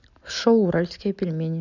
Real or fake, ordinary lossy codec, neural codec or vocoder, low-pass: real; none; none; 7.2 kHz